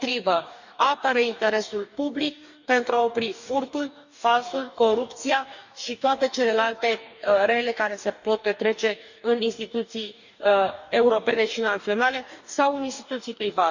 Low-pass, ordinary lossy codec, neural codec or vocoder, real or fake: 7.2 kHz; none; codec, 44.1 kHz, 2.6 kbps, DAC; fake